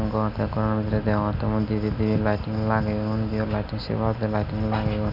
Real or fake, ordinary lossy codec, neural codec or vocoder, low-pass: real; none; none; 5.4 kHz